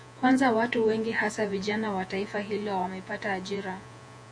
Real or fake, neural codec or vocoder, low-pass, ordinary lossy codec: fake; vocoder, 48 kHz, 128 mel bands, Vocos; 9.9 kHz; AAC, 64 kbps